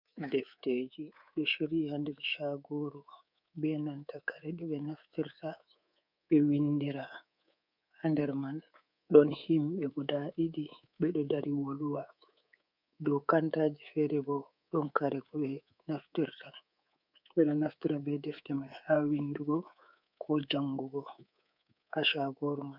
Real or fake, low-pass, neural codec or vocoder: fake; 5.4 kHz; codec, 24 kHz, 3.1 kbps, DualCodec